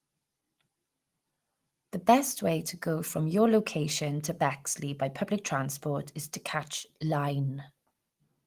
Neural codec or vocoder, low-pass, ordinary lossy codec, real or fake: none; 14.4 kHz; Opus, 24 kbps; real